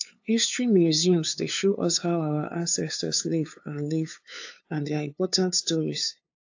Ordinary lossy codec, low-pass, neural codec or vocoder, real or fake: AAC, 48 kbps; 7.2 kHz; codec, 16 kHz, 2 kbps, FunCodec, trained on LibriTTS, 25 frames a second; fake